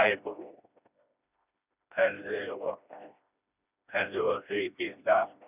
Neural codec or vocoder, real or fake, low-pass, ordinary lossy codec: codec, 16 kHz, 1 kbps, FreqCodec, smaller model; fake; 3.6 kHz; none